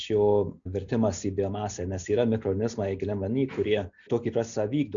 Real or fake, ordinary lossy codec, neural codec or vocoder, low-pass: real; MP3, 64 kbps; none; 7.2 kHz